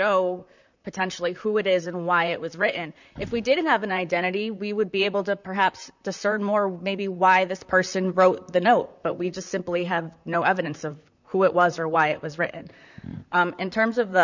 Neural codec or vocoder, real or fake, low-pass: vocoder, 44.1 kHz, 128 mel bands, Pupu-Vocoder; fake; 7.2 kHz